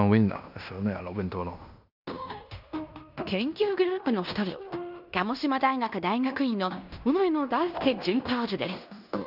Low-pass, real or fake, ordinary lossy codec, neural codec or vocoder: 5.4 kHz; fake; none; codec, 16 kHz in and 24 kHz out, 0.9 kbps, LongCat-Audio-Codec, fine tuned four codebook decoder